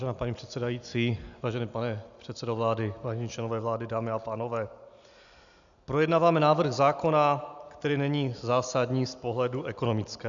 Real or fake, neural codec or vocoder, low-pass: real; none; 7.2 kHz